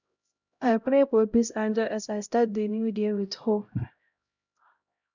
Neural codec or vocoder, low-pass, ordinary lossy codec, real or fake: codec, 16 kHz, 0.5 kbps, X-Codec, HuBERT features, trained on LibriSpeech; 7.2 kHz; none; fake